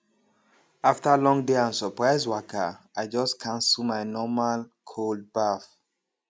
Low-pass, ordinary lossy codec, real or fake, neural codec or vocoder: none; none; real; none